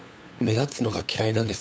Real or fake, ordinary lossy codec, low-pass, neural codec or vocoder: fake; none; none; codec, 16 kHz, 8 kbps, FunCodec, trained on LibriTTS, 25 frames a second